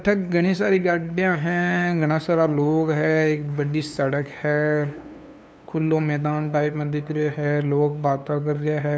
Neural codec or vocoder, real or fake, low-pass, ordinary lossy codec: codec, 16 kHz, 2 kbps, FunCodec, trained on LibriTTS, 25 frames a second; fake; none; none